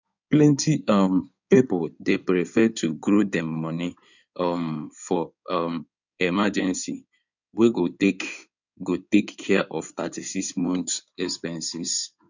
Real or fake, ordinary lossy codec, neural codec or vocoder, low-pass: fake; none; codec, 16 kHz in and 24 kHz out, 2.2 kbps, FireRedTTS-2 codec; 7.2 kHz